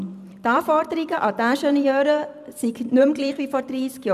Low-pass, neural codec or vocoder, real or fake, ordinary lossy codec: 14.4 kHz; vocoder, 44.1 kHz, 128 mel bands every 512 samples, BigVGAN v2; fake; none